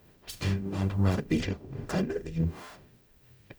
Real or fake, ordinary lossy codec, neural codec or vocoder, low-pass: fake; none; codec, 44.1 kHz, 0.9 kbps, DAC; none